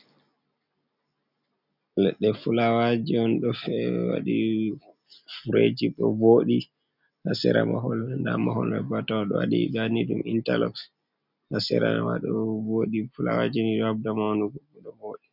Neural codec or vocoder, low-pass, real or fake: none; 5.4 kHz; real